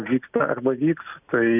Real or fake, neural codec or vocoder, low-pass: real; none; 3.6 kHz